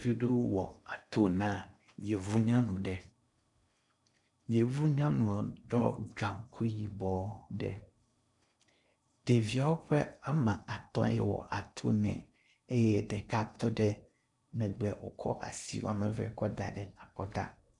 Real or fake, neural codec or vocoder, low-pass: fake; codec, 16 kHz in and 24 kHz out, 0.8 kbps, FocalCodec, streaming, 65536 codes; 10.8 kHz